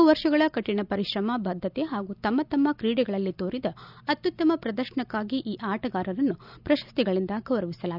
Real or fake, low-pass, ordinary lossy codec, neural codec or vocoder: real; 5.4 kHz; none; none